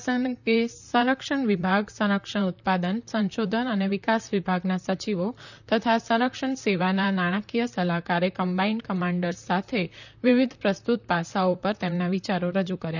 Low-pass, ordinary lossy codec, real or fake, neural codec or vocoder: 7.2 kHz; none; fake; vocoder, 44.1 kHz, 128 mel bands, Pupu-Vocoder